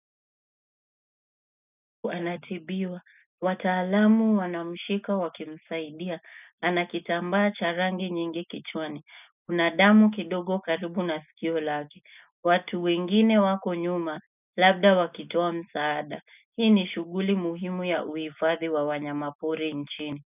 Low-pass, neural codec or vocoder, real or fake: 3.6 kHz; none; real